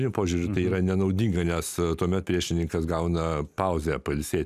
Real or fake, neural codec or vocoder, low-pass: real; none; 14.4 kHz